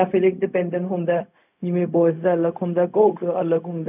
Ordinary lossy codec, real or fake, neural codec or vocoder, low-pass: none; fake; codec, 16 kHz, 0.4 kbps, LongCat-Audio-Codec; 3.6 kHz